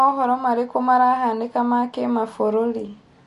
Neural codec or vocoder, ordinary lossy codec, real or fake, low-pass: none; MP3, 48 kbps; real; 14.4 kHz